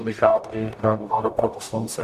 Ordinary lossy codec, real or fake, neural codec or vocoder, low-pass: Opus, 64 kbps; fake; codec, 44.1 kHz, 0.9 kbps, DAC; 14.4 kHz